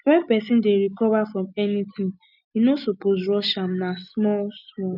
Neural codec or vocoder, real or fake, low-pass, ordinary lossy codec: none; real; 5.4 kHz; none